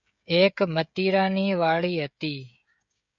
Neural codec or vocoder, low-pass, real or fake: codec, 16 kHz, 16 kbps, FreqCodec, smaller model; 7.2 kHz; fake